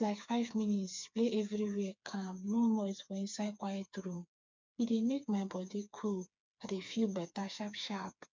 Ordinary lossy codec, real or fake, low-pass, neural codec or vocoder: MP3, 64 kbps; fake; 7.2 kHz; codec, 16 kHz, 4 kbps, FreqCodec, smaller model